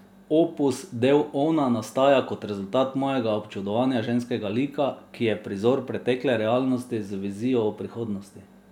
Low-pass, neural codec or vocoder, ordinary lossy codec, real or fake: 19.8 kHz; none; none; real